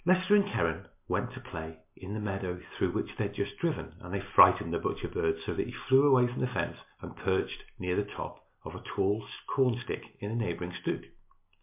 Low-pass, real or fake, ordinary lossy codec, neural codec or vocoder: 3.6 kHz; real; MP3, 32 kbps; none